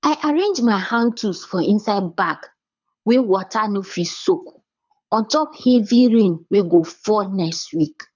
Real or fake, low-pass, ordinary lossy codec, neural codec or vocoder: fake; 7.2 kHz; none; codec, 24 kHz, 6 kbps, HILCodec